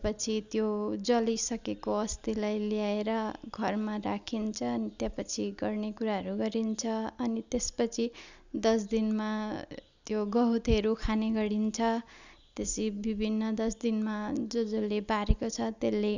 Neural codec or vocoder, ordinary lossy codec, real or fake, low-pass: none; none; real; 7.2 kHz